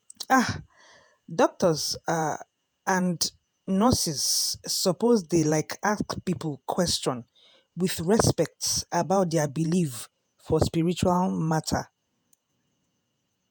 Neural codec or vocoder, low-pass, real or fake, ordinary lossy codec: vocoder, 48 kHz, 128 mel bands, Vocos; none; fake; none